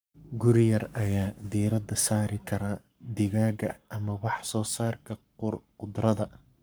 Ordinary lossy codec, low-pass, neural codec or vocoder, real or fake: none; none; codec, 44.1 kHz, 7.8 kbps, Pupu-Codec; fake